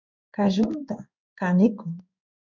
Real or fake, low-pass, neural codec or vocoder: fake; 7.2 kHz; codec, 16 kHz in and 24 kHz out, 1 kbps, XY-Tokenizer